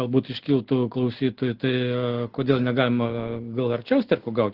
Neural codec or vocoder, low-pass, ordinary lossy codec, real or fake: none; 5.4 kHz; Opus, 16 kbps; real